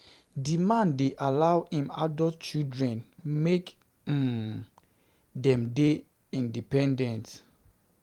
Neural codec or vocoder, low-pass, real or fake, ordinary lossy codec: vocoder, 44.1 kHz, 128 mel bands every 512 samples, BigVGAN v2; 19.8 kHz; fake; Opus, 32 kbps